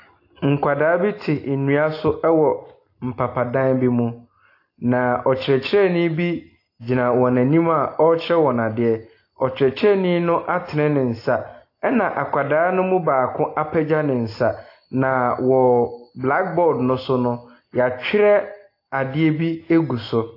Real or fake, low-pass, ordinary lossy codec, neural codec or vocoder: real; 5.4 kHz; AAC, 32 kbps; none